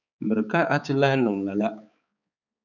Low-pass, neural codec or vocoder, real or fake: 7.2 kHz; codec, 16 kHz, 4 kbps, X-Codec, HuBERT features, trained on balanced general audio; fake